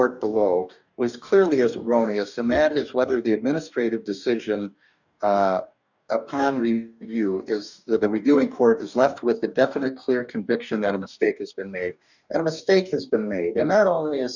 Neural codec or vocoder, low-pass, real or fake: codec, 44.1 kHz, 2.6 kbps, DAC; 7.2 kHz; fake